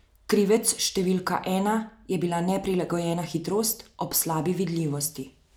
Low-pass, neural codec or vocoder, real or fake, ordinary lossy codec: none; none; real; none